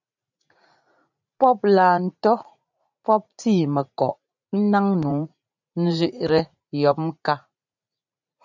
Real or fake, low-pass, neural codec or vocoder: fake; 7.2 kHz; vocoder, 44.1 kHz, 80 mel bands, Vocos